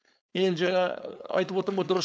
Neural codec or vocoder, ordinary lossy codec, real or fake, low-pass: codec, 16 kHz, 4.8 kbps, FACodec; none; fake; none